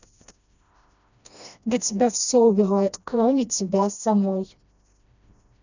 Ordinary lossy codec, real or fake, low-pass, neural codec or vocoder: none; fake; 7.2 kHz; codec, 16 kHz, 1 kbps, FreqCodec, smaller model